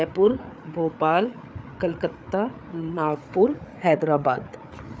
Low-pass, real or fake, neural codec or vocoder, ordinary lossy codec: none; fake; codec, 16 kHz, 8 kbps, FreqCodec, larger model; none